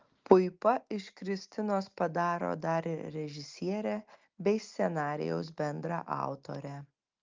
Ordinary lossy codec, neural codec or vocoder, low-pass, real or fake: Opus, 32 kbps; none; 7.2 kHz; real